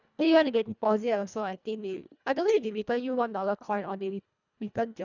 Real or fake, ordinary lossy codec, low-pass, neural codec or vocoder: fake; none; 7.2 kHz; codec, 24 kHz, 1.5 kbps, HILCodec